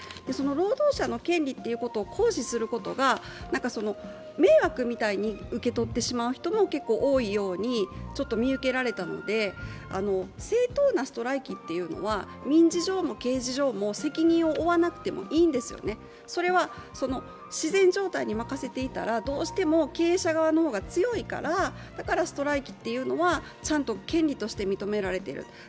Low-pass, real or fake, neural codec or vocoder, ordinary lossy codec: none; real; none; none